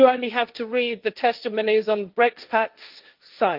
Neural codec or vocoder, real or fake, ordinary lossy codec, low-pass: codec, 16 kHz, 1.1 kbps, Voila-Tokenizer; fake; Opus, 24 kbps; 5.4 kHz